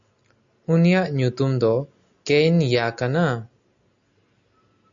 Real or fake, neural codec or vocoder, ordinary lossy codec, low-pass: real; none; AAC, 64 kbps; 7.2 kHz